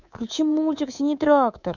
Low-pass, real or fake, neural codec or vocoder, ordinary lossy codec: 7.2 kHz; fake; codec, 24 kHz, 3.1 kbps, DualCodec; none